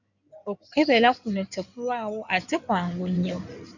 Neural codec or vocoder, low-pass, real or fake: codec, 16 kHz in and 24 kHz out, 2.2 kbps, FireRedTTS-2 codec; 7.2 kHz; fake